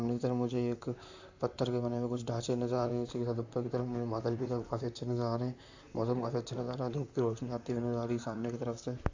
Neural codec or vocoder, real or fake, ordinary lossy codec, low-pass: vocoder, 44.1 kHz, 128 mel bands, Pupu-Vocoder; fake; AAC, 48 kbps; 7.2 kHz